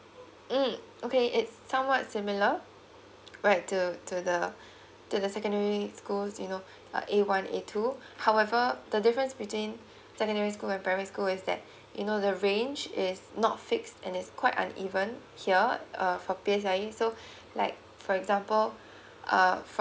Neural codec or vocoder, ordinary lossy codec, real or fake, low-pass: none; none; real; none